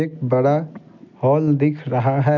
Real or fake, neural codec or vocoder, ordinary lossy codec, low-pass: real; none; none; 7.2 kHz